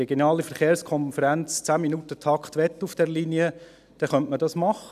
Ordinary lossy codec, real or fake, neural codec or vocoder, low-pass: none; real; none; 14.4 kHz